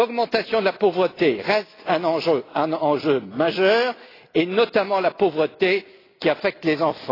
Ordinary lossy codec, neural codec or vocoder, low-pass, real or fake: AAC, 24 kbps; none; 5.4 kHz; real